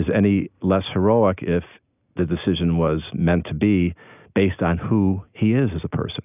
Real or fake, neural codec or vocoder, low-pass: real; none; 3.6 kHz